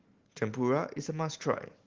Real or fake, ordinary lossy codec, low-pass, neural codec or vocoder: real; Opus, 16 kbps; 7.2 kHz; none